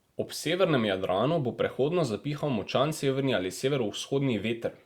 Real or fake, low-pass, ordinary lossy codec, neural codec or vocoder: real; 19.8 kHz; Opus, 64 kbps; none